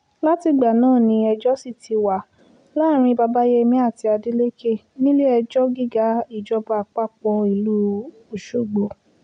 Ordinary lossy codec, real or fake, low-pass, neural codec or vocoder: none; real; 9.9 kHz; none